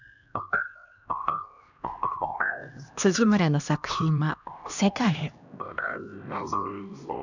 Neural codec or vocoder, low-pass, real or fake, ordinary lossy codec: codec, 16 kHz, 1 kbps, X-Codec, HuBERT features, trained on LibriSpeech; 7.2 kHz; fake; none